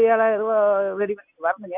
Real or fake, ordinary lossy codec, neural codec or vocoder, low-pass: real; none; none; 3.6 kHz